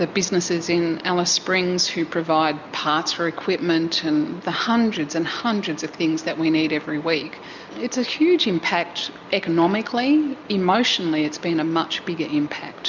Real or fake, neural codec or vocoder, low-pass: real; none; 7.2 kHz